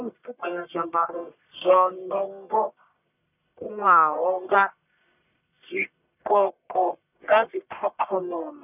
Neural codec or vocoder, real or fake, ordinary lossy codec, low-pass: codec, 44.1 kHz, 1.7 kbps, Pupu-Codec; fake; none; 3.6 kHz